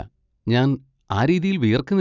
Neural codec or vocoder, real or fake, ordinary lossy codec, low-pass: none; real; none; 7.2 kHz